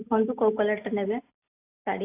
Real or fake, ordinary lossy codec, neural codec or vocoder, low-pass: real; AAC, 24 kbps; none; 3.6 kHz